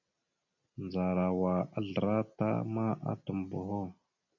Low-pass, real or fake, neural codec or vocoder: 7.2 kHz; real; none